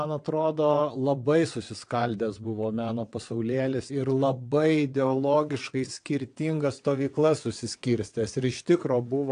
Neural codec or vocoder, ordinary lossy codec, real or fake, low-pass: vocoder, 22.05 kHz, 80 mel bands, WaveNeXt; AAC, 64 kbps; fake; 9.9 kHz